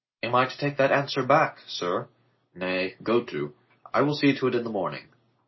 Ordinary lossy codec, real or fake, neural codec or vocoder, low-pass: MP3, 24 kbps; real; none; 7.2 kHz